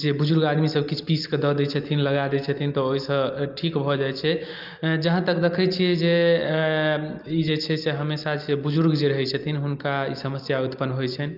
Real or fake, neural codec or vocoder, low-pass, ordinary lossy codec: real; none; 5.4 kHz; Opus, 24 kbps